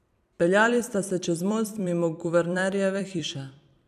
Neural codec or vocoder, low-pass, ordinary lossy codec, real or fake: vocoder, 44.1 kHz, 128 mel bands every 256 samples, BigVGAN v2; 14.4 kHz; MP3, 96 kbps; fake